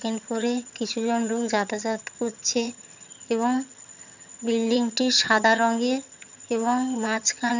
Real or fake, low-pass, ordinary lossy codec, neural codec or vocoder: fake; 7.2 kHz; none; vocoder, 22.05 kHz, 80 mel bands, HiFi-GAN